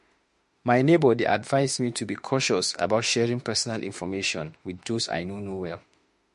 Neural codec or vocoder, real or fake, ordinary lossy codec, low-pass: autoencoder, 48 kHz, 32 numbers a frame, DAC-VAE, trained on Japanese speech; fake; MP3, 48 kbps; 14.4 kHz